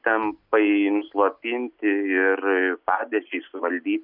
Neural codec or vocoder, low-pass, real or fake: none; 5.4 kHz; real